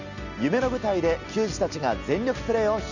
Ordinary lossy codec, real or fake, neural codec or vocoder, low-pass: none; real; none; 7.2 kHz